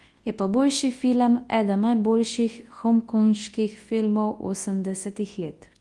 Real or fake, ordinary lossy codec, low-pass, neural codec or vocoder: fake; Opus, 24 kbps; 10.8 kHz; codec, 24 kHz, 0.9 kbps, WavTokenizer, large speech release